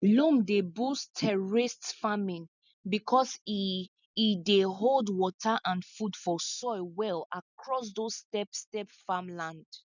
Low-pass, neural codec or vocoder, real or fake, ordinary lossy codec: 7.2 kHz; none; real; none